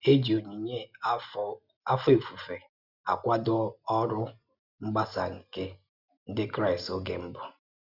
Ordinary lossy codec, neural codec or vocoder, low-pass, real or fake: none; none; 5.4 kHz; real